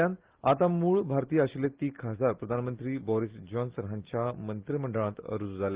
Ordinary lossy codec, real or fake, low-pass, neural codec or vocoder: Opus, 16 kbps; real; 3.6 kHz; none